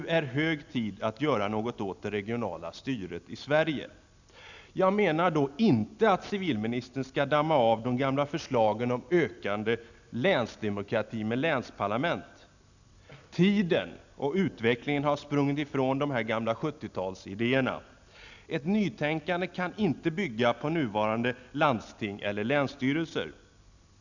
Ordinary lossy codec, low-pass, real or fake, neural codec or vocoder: none; 7.2 kHz; real; none